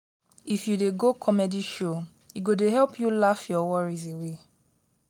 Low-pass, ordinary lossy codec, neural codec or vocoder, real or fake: 19.8 kHz; none; none; real